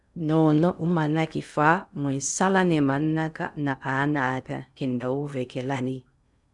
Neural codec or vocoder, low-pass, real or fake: codec, 16 kHz in and 24 kHz out, 0.6 kbps, FocalCodec, streaming, 2048 codes; 10.8 kHz; fake